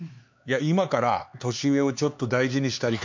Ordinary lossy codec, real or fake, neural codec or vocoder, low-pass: MP3, 64 kbps; fake; codec, 16 kHz, 4 kbps, X-Codec, HuBERT features, trained on LibriSpeech; 7.2 kHz